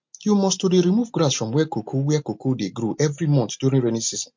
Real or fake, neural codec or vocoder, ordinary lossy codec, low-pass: real; none; MP3, 48 kbps; 7.2 kHz